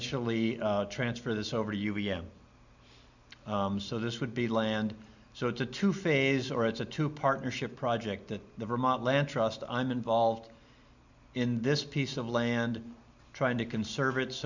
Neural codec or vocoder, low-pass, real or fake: none; 7.2 kHz; real